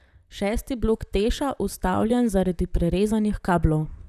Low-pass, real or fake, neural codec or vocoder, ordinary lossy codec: 14.4 kHz; fake; vocoder, 44.1 kHz, 128 mel bands, Pupu-Vocoder; none